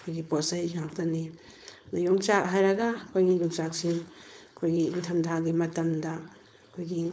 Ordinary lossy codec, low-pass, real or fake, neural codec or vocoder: none; none; fake; codec, 16 kHz, 4.8 kbps, FACodec